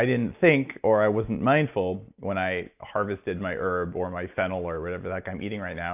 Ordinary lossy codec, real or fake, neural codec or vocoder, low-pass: AAC, 32 kbps; real; none; 3.6 kHz